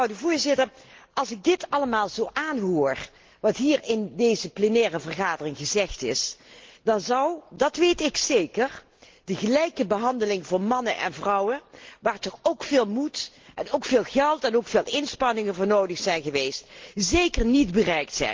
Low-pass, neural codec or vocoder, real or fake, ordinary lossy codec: 7.2 kHz; none; real; Opus, 16 kbps